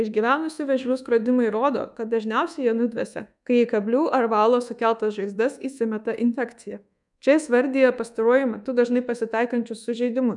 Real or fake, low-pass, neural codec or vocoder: fake; 10.8 kHz; codec, 24 kHz, 1.2 kbps, DualCodec